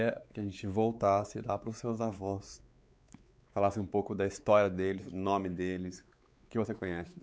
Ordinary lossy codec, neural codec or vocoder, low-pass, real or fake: none; codec, 16 kHz, 4 kbps, X-Codec, WavLM features, trained on Multilingual LibriSpeech; none; fake